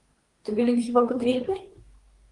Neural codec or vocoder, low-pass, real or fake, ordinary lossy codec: codec, 24 kHz, 1 kbps, SNAC; 10.8 kHz; fake; Opus, 32 kbps